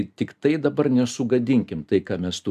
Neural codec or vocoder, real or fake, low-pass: none; real; 14.4 kHz